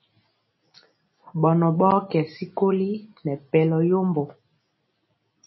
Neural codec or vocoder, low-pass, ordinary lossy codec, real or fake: none; 7.2 kHz; MP3, 24 kbps; real